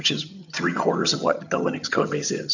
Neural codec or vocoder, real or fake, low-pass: vocoder, 22.05 kHz, 80 mel bands, HiFi-GAN; fake; 7.2 kHz